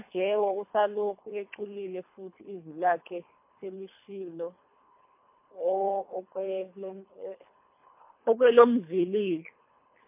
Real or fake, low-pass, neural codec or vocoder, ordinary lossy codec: fake; 3.6 kHz; codec, 24 kHz, 3 kbps, HILCodec; MP3, 24 kbps